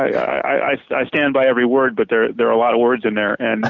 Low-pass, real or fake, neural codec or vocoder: 7.2 kHz; real; none